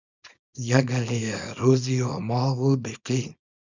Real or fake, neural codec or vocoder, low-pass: fake; codec, 24 kHz, 0.9 kbps, WavTokenizer, small release; 7.2 kHz